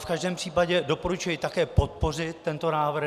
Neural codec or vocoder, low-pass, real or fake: none; 14.4 kHz; real